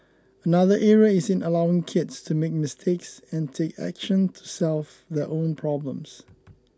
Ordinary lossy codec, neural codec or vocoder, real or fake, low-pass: none; none; real; none